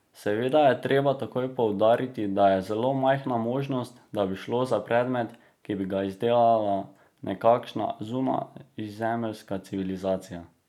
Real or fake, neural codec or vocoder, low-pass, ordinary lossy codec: real; none; 19.8 kHz; none